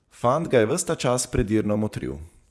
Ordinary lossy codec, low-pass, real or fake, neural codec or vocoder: none; none; fake; vocoder, 24 kHz, 100 mel bands, Vocos